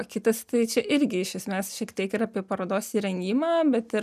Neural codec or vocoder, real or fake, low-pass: none; real; 14.4 kHz